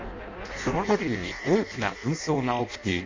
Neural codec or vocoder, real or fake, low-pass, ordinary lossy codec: codec, 16 kHz in and 24 kHz out, 0.6 kbps, FireRedTTS-2 codec; fake; 7.2 kHz; MP3, 48 kbps